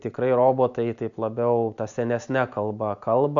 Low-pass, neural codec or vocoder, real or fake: 7.2 kHz; none; real